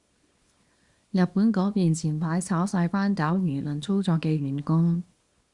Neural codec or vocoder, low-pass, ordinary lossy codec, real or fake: codec, 24 kHz, 0.9 kbps, WavTokenizer, small release; 10.8 kHz; Opus, 64 kbps; fake